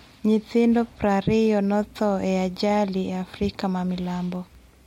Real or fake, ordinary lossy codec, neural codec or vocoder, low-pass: real; MP3, 64 kbps; none; 19.8 kHz